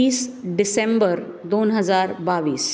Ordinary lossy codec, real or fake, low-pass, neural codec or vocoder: none; real; none; none